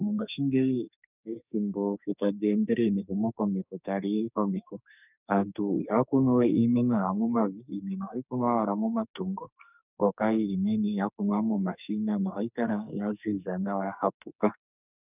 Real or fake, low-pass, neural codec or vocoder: fake; 3.6 kHz; codec, 44.1 kHz, 2.6 kbps, SNAC